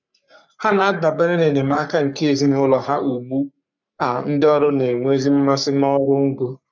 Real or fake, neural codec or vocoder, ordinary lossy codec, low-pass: fake; codec, 44.1 kHz, 3.4 kbps, Pupu-Codec; none; 7.2 kHz